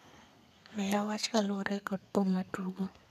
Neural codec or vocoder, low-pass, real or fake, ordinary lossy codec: codec, 32 kHz, 1.9 kbps, SNAC; 14.4 kHz; fake; none